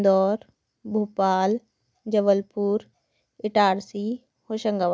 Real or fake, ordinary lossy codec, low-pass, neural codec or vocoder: real; none; none; none